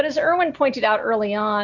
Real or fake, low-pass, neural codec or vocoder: real; 7.2 kHz; none